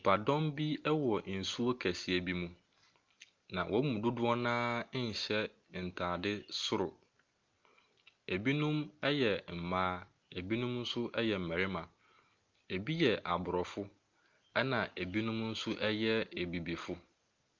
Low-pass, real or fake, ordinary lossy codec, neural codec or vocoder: 7.2 kHz; real; Opus, 24 kbps; none